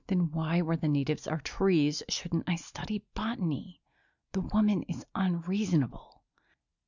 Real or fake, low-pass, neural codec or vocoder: real; 7.2 kHz; none